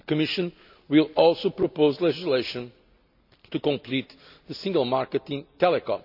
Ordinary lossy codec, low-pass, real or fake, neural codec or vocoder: none; 5.4 kHz; real; none